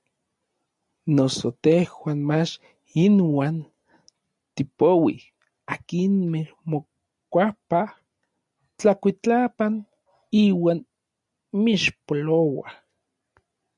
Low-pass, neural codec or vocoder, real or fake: 10.8 kHz; none; real